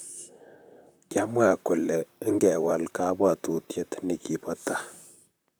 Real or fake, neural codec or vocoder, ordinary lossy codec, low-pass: fake; vocoder, 44.1 kHz, 128 mel bands, Pupu-Vocoder; none; none